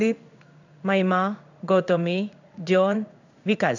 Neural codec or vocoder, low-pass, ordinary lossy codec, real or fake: codec, 16 kHz in and 24 kHz out, 1 kbps, XY-Tokenizer; 7.2 kHz; none; fake